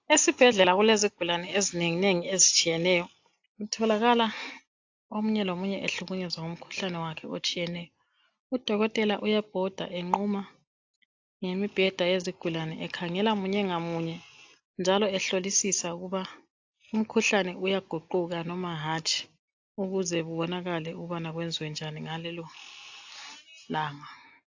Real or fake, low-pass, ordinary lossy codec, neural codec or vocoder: real; 7.2 kHz; AAC, 48 kbps; none